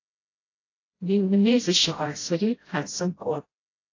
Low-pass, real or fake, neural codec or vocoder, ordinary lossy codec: 7.2 kHz; fake; codec, 16 kHz, 0.5 kbps, FreqCodec, smaller model; AAC, 32 kbps